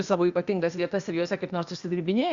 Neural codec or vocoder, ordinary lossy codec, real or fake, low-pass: codec, 16 kHz, 0.8 kbps, ZipCodec; Opus, 64 kbps; fake; 7.2 kHz